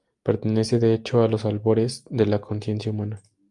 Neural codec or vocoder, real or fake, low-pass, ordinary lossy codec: none; real; 10.8 kHz; Opus, 32 kbps